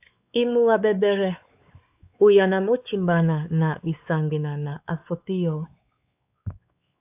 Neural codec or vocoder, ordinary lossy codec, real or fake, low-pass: codec, 16 kHz, 4 kbps, X-Codec, WavLM features, trained on Multilingual LibriSpeech; AAC, 32 kbps; fake; 3.6 kHz